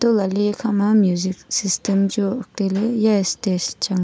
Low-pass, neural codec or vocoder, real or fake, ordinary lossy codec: none; none; real; none